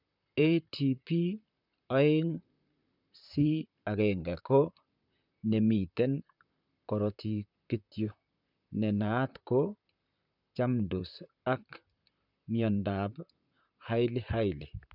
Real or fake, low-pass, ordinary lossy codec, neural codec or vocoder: fake; 5.4 kHz; none; vocoder, 44.1 kHz, 128 mel bands, Pupu-Vocoder